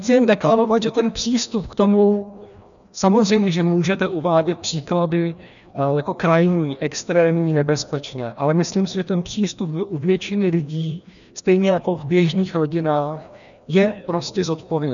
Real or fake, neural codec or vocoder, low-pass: fake; codec, 16 kHz, 1 kbps, FreqCodec, larger model; 7.2 kHz